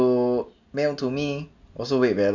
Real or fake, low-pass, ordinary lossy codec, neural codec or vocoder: real; 7.2 kHz; none; none